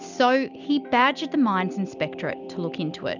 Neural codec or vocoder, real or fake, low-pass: none; real; 7.2 kHz